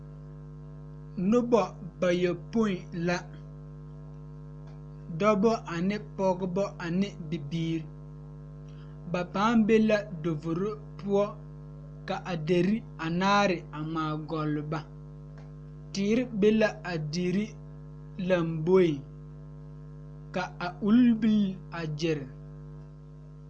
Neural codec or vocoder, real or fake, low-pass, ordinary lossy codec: none; real; 9.9 kHz; MP3, 64 kbps